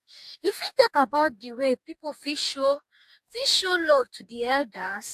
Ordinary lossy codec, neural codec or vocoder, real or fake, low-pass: AAC, 96 kbps; codec, 44.1 kHz, 2.6 kbps, DAC; fake; 14.4 kHz